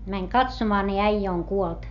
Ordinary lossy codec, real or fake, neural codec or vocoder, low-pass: none; real; none; 7.2 kHz